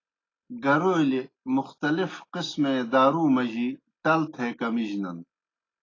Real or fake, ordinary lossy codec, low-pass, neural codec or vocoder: real; AAC, 32 kbps; 7.2 kHz; none